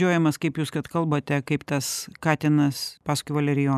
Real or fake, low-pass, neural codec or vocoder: real; 14.4 kHz; none